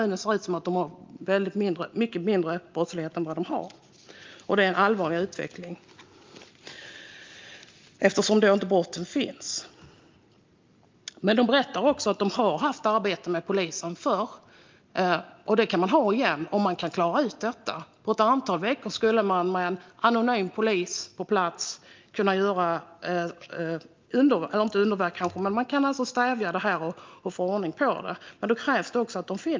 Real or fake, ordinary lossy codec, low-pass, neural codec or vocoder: real; Opus, 24 kbps; 7.2 kHz; none